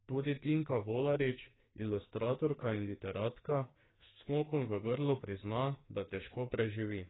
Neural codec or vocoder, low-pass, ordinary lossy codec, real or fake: codec, 44.1 kHz, 2.6 kbps, SNAC; 7.2 kHz; AAC, 16 kbps; fake